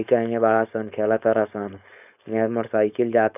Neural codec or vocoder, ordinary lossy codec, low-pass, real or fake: codec, 16 kHz, 4.8 kbps, FACodec; none; 3.6 kHz; fake